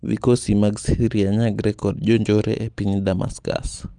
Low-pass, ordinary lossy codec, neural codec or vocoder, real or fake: 9.9 kHz; Opus, 32 kbps; none; real